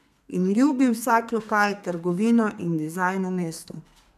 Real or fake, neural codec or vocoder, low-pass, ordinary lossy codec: fake; codec, 32 kHz, 1.9 kbps, SNAC; 14.4 kHz; none